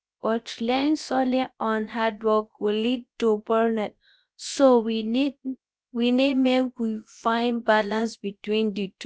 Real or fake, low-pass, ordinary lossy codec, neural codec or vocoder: fake; none; none; codec, 16 kHz, about 1 kbps, DyCAST, with the encoder's durations